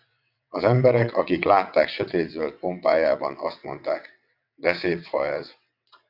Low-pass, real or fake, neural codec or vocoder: 5.4 kHz; fake; vocoder, 22.05 kHz, 80 mel bands, WaveNeXt